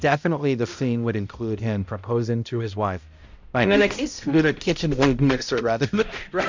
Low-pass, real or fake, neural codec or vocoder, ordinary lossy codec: 7.2 kHz; fake; codec, 16 kHz, 0.5 kbps, X-Codec, HuBERT features, trained on balanced general audio; MP3, 64 kbps